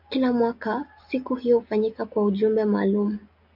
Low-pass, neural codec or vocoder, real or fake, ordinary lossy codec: 5.4 kHz; none; real; MP3, 48 kbps